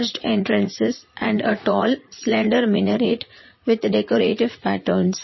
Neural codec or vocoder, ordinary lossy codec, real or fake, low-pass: vocoder, 44.1 kHz, 80 mel bands, Vocos; MP3, 24 kbps; fake; 7.2 kHz